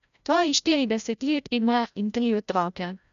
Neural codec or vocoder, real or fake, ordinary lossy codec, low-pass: codec, 16 kHz, 0.5 kbps, FreqCodec, larger model; fake; none; 7.2 kHz